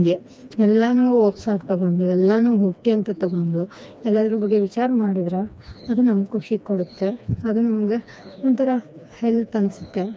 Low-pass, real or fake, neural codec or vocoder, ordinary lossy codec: none; fake; codec, 16 kHz, 2 kbps, FreqCodec, smaller model; none